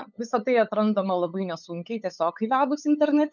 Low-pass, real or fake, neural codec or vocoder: 7.2 kHz; fake; codec, 16 kHz, 8 kbps, FunCodec, trained on LibriTTS, 25 frames a second